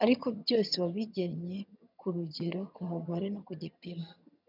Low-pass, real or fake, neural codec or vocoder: 5.4 kHz; fake; vocoder, 22.05 kHz, 80 mel bands, HiFi-GAN